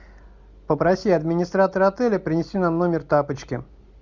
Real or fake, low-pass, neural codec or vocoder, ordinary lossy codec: real; 7.2 kHz; none; Opus, 64 kbps